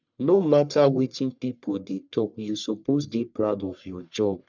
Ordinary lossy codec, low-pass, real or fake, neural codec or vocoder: none; 7.2 kHz; fake; codec, 44.1 kHz, 1.7 kbps, Pupu-Codec